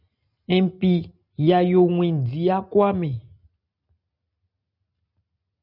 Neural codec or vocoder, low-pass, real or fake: none; 5.4 kHz; real